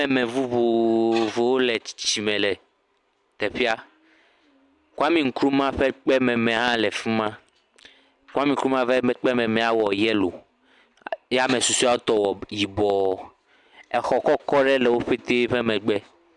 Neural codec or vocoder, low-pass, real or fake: none; 10.8 kHz; real